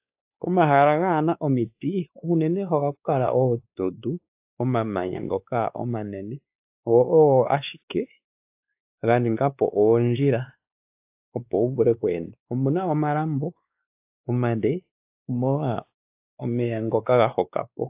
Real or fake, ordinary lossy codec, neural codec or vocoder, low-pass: fake; AAC, 32 kbps; codec, 16 kHz, 2 kbps, X-Codec, WavLM features, trained on Multilingual LibriSpeech; 3.6 kHz